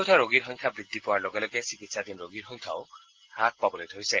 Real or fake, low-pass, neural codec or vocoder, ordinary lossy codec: real; 7.2 kHz; none; Opus, 16 kbps